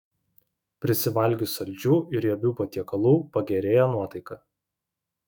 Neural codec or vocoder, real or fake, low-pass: autoencoder, 48 kHz, 128 numbers a frame, DAC-VAE, trained on Japanese speech; fake; 19.8 kHz